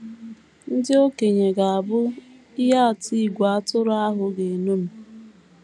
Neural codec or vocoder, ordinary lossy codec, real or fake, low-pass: none; none; real; none